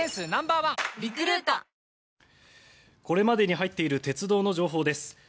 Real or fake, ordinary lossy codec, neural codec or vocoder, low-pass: real; none; none; none